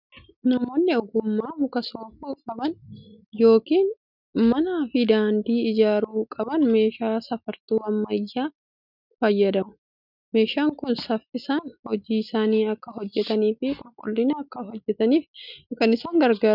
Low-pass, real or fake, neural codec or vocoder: 5.4 kHz; real; none